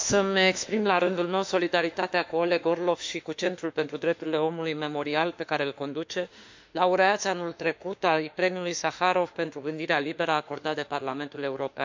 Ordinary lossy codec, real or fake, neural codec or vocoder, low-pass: MP3, 64 kbps; fake; autoencoder, 48 kHz, 32 numbers a frame, DAC-VAE, trained on Japanese speech; 7.2 kHz